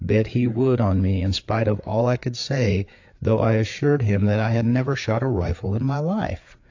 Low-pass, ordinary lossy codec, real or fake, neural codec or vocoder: 7.2 kHz; AAC, 48 kbps; fake; codec, 16 kHz, 4 kbps, FreqCodec, larger model